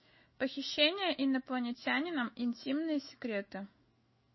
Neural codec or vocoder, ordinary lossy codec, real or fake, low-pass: autoencoder, 48 kHz, 128 numbers a frame, DAC-VAE, trained on Japanese speech; MP3, 24 kbps; fake; 7.2 kHz